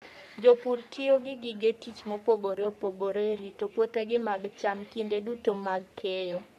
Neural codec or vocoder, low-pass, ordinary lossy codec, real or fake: codec, 44.1 kHz, 3.4 kbps, Pupu-Codec; 14.4 kHz; none; fake